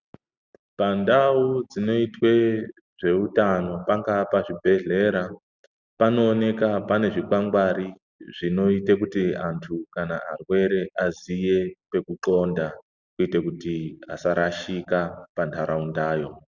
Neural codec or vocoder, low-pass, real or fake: none; 7.2 kHz; real